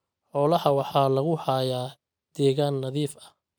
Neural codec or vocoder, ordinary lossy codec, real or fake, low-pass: none; none; real; none